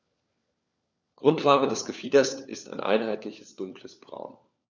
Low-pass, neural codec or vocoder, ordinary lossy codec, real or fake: none; codec, 16 kHz, 4 kbps, FunCodec, trained on LibriTTS, 50 frames a second; none; fake